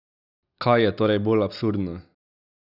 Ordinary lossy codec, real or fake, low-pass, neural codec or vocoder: none; real; 5.4 kHz; none